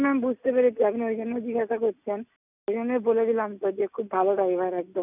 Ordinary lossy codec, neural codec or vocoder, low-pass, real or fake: none; none; 3.6 kHz; real